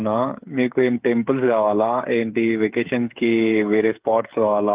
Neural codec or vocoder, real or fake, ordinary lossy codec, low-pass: codec, 16 kHz, 8 kbps, FreqCodec, smaller model; fake; Opus, 24 kbps; 3.6 kHz